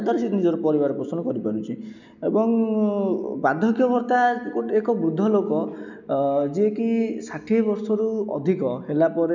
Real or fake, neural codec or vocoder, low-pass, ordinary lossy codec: real; none; 7.2 kHz; none